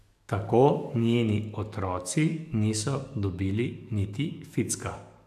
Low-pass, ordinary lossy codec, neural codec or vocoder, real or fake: 14.4 kHz; none; codec, 44.1 kHz, 7.8 kbps, DAC; fake